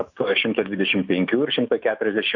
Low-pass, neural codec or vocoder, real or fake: 7.2 kHz; autoencoder, 48 kHz, 128 numbers a frame, DAC-VAE, trained on Japanese speech; fake